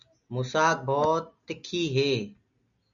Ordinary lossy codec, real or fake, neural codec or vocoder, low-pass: MP3, 96 kbps; real; none; 7.2 kHz